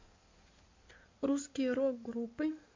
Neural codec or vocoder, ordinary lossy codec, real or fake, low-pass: codec, 16 kHz in and 24 kHz out, 2.2 kbps, FireRedTTS-2 codec; MP3, 48 kbps; fake; 7.2 kHz